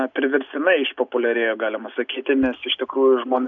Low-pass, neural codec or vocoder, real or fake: 7.2 kHz; none; real